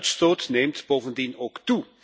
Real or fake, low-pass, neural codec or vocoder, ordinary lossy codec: real; none; none; none